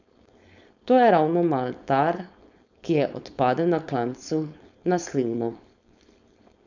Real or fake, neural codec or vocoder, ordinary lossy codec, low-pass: fake; codec, 16 kHz, 4.8 kbps, FACodec; none; 7.2 kHz